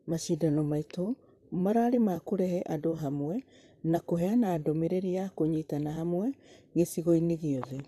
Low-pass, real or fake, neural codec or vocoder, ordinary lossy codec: 14.4 kHz; fake; vocoder, 44.1 kHz, 128 mel bands, Pupu-Vocoder; none